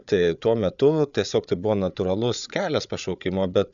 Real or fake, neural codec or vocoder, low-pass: fake; codec, 16 kHz, 8 kbps, FreqCodec, larger model; 7.2 kHz